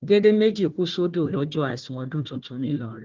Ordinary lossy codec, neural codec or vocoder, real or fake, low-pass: Opus, 16 kbps; codec, 16 kHz, 1 kbps, FunCodec, trained on Chinese and English, 50 frames a second; fake; 7.2 kHz